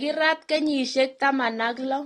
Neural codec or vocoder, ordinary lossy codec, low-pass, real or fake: none; AAC, 32 kbps; 19.8 kHz; real